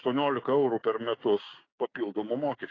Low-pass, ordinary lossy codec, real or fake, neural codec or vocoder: 7.2 kHz; AAC, 32 kbps; fake; codec, 16 kHz, 6 kbps, DAC